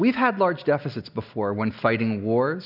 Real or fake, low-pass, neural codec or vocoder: real; 5.4 kHz; none